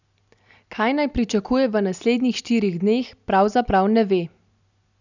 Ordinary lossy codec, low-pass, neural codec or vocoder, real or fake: none; 7.2 kHz; none; real